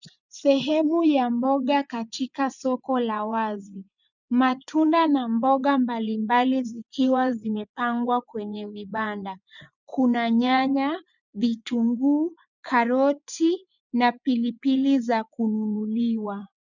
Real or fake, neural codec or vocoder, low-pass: fake; vocoder, 24 kHz, 100 mel bands, Vocos; 7.2 kHz